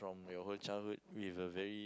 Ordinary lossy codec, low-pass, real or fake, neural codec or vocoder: none; none; real; none